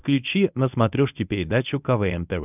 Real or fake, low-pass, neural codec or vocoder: fake; 3.6 kHz; codec, 24 kHz, 0.9 kbps, WavTokenizer, medium speech release version 1